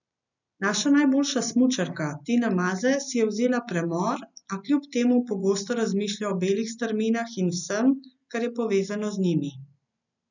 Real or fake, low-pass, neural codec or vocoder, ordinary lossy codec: real; 7.2 kHz; none; none